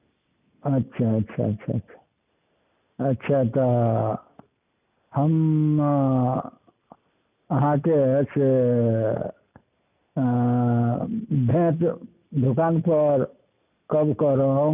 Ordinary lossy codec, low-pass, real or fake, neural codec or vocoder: none; 3.6 kHz; real; none